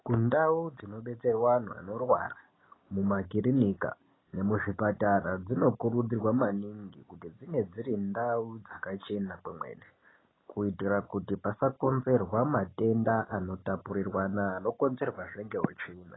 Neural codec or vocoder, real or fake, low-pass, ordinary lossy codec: none; real; 7.2 kHz; AAC, 16 kbps